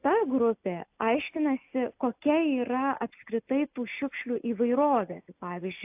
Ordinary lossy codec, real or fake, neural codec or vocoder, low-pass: AAC, 32 kbps; real; none; 3.6 kHz